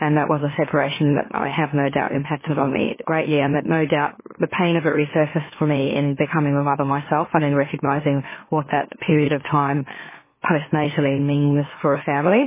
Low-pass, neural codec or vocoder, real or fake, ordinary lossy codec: 3.6 kHz; autoencoder, 44.1 kHz, a latent of 192 numbers a frame, MeloTTS; fake; MP3, 16 kbps